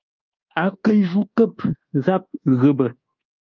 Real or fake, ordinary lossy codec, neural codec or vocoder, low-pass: fake; Opus, 24 kbps; codec, 24 kHz, 1.2 kbps, DualCodec; 7.2 kHz